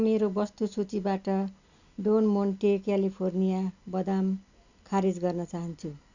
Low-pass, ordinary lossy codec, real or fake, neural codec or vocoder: 7.2 kHz; none; real; none